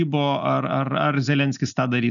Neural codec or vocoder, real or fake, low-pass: none; real; 7.2 kHz